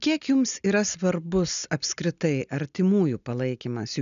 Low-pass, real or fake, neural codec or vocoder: 7.2 kHz; real; none